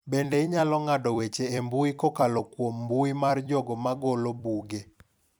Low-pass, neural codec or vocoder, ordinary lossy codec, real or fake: none; vocoder, 44.1 kHz, 128 mel bands every 256 samples, BigVGAN v2; none; fake